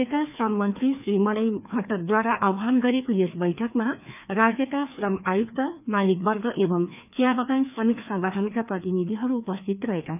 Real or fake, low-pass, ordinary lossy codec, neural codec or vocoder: fake; 3.6 kHz; none; codec, 16 kHz, 2 kbps, FreqCodec, larger model